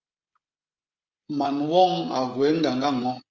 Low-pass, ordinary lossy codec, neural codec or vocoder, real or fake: 7.2 kHz; Opus, 32 kbps; codec, 16 kHz, 16 kbps, FreqCodec, smaller model; fake